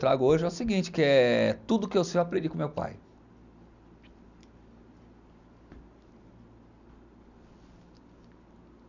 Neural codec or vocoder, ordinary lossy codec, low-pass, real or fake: none; none; 7.2 kHz; real